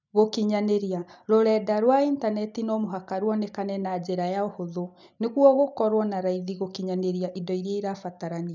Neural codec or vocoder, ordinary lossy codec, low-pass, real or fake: none; none; 7.2 kHz; real